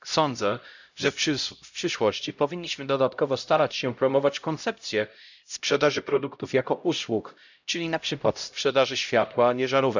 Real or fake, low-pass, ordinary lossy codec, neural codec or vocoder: fake; 7.2 kHz; none; codec, 16 kHz, 0.5 kbps, X-Codec, HuBERT features, trained on LibriSpeech